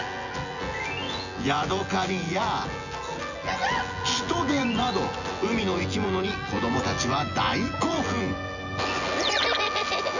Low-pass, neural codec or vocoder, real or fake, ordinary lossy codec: 7.2 kHz; vocoder, 24 kHz, 100 mel bands, Vocos; fake; none